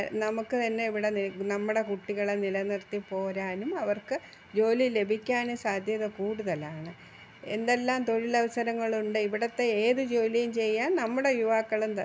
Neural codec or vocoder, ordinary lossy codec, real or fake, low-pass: none; none; real; none